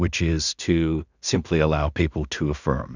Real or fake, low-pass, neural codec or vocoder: fake; 7.2 kHz; codec, 16 kHz in and 24 kHz out, 0.4 kbps, LongCat-Audio-Codec, two codebook decoder